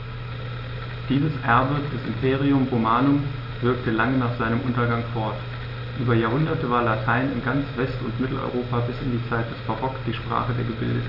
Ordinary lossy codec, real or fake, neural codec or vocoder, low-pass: none; real; none; 5.4 kHz